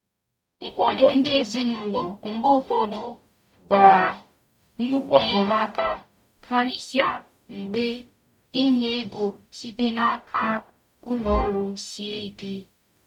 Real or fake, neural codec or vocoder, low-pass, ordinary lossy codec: fake; codec, 44.1 kHz, 0.9 kbps, DAC; 19.8 kHz; none